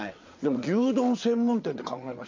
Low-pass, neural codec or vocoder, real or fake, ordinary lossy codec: 7.2 kHz; vocoder, 22.05 kHz, 80 mel bands, WaveNeXt; fake; MP3, 64 kbps